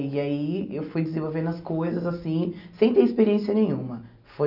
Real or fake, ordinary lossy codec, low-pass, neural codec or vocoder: real; none; 5.4 kHz; none